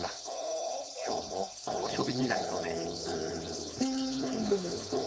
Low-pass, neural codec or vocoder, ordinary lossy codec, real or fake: none; codec, 16 kHz, 4.8 kbps, FACodec; none; fake